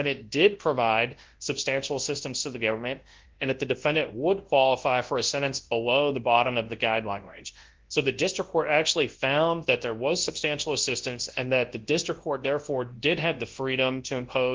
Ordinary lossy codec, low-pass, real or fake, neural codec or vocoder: Opus, 16 kbps; 7.2 kHz; fake; codec, 24 kHz, 0.9 kbps, WavTokenizer, large speech release